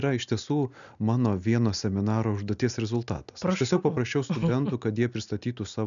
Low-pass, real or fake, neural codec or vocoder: 7.2 kHz; real; none